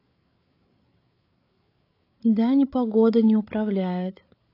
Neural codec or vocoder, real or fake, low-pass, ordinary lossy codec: codec, 16 kHz, 8 kbps, FreqCodec, larger model; fake; 5.4 kHz; AAC, 32 kbps